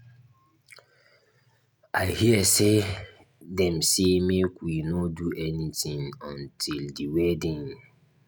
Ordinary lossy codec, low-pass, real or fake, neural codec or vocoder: none; none; real; none